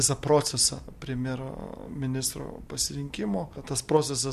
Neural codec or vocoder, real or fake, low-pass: none; real; 14.4 kHz